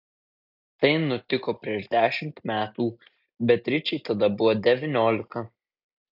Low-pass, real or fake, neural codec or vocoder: 5.4 kHz; real; none